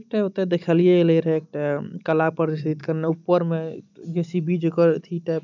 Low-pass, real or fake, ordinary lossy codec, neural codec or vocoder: 7.2 kHz; real; none; none